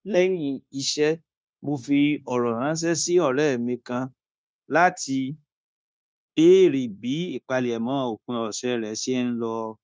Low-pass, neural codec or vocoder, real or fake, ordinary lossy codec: none; codec, 16 kHz, 0.9 kbps, LongCat-Audio-Codec; fake; none